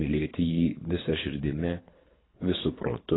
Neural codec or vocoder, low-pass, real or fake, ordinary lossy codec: codec, 24 kHz, 3 kbps, HILCodec; 7.2 kHz; fake; AAC, 16 kbps